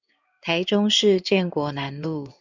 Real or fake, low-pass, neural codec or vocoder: fake; 7.2 kHz; codec, 16 kHz in and 24 kHz out, 1 kbps, XY-Tokenizer